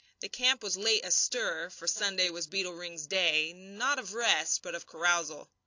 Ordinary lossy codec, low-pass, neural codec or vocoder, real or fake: AAC, 48 kbps; 7.2 kHz; none; real